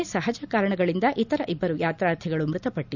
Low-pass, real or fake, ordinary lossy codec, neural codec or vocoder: 7.2 kHz; real; none; none